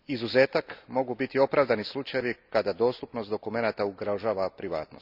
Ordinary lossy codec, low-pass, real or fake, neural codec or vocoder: Opus, 64 kbps; 5.4 kHz; real; none